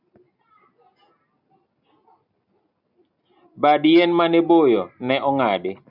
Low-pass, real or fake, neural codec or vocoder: 5.4 kHz; real; none